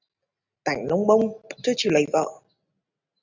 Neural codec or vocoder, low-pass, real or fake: none; 7.2 kHz; real